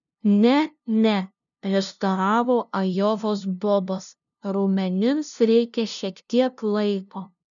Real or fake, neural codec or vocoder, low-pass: fake; codec, 16 kHz, 0.5 kbps, FunCodec, trained on LibriTTS, 25 frames a second; 7.2 kHz